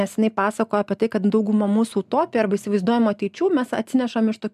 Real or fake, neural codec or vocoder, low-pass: real; none; 14.4 kHz